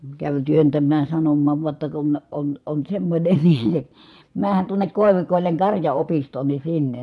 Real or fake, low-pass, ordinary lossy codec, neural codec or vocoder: fake; none; none; vocoder, 22.05 kHz, 80 mel bands, Vocos